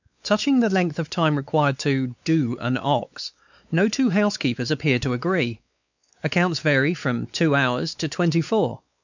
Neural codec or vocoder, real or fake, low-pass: codec, 16 kHz, 4 kbps, X-Codec, WavLM features, trained on Multilingual LibriSpeech; fake; 7.2 kHz